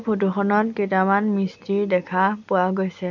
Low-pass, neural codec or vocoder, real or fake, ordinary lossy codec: 7.2 kHz; none; real; none